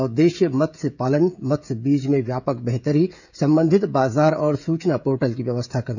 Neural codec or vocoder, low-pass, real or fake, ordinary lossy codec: codec, 16 kHz, 16 kbps, FreqCodec, smaller model; 7.2 kHz; fake; none